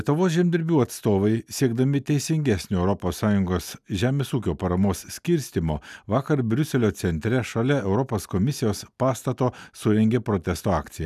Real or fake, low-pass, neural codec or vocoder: real; 14.4 kHz; none